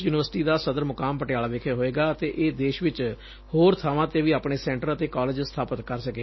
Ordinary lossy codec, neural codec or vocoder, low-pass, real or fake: MP3, 24 kbps; none; 7.2 kHz; real